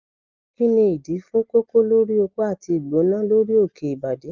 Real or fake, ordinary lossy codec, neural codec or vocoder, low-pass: real; Opus, 24 kbps; none; 7.2 kHz